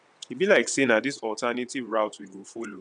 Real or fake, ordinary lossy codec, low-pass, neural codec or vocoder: fake; none; 9.9 kHz; vocoder, 22.05 kHz, 80 mel bands, WaveNeXt